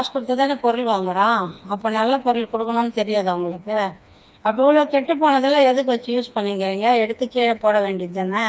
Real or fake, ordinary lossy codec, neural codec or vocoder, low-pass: fake; none; codec, 16 kHz, 2 kbps, FreqCodec, smaller model; none